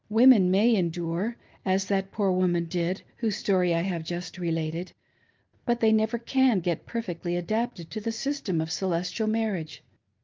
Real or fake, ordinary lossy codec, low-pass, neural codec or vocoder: real; Opus, 24 kbps; 7.2 kHz; none